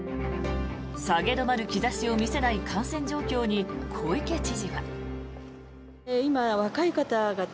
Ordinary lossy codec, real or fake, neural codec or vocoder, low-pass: none; real; none; none